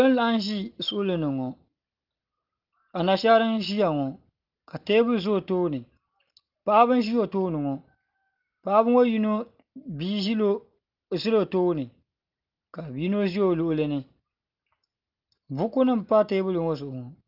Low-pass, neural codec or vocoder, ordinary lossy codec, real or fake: 5.4 kHz; none; Opus, 24 kbps; real